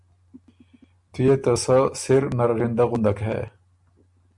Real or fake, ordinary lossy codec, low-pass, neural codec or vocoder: real; MP3, 64 kbps; 10.8 kHz; none